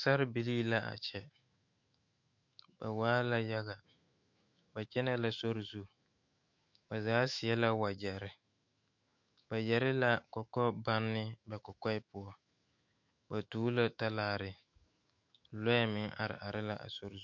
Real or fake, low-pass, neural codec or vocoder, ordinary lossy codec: fake; 7.2 kHz; autoencoder, 48 kHz, 128 numbers a frame, DAC-VAE, trained on Japanese speech; MP3, 48 kbps